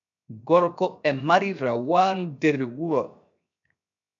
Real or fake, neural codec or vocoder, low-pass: fake; codec, 16 kHz, 0.7 kbps, FocalCodec; 7.2 kHz